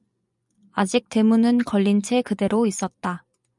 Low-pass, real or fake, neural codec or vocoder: 10.8 kHz; fake; vocoder, 24 kHz, 100 mel bands, Vocos